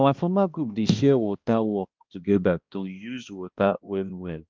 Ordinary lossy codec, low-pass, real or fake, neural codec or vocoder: Opus, 32 kbps; 7.2 kHz; fake; codec, 16 kHz, 1 kbps, X-Codec, HuBERT features, trained on balanced general audio